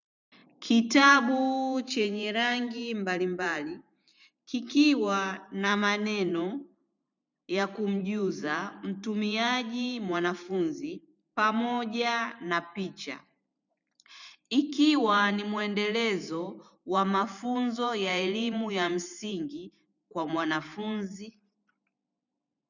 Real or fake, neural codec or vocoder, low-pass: fake; vocoder, 44.1 kHz, 128 mel bands every 512 samples, BigVGAN v2; 7.2 kHz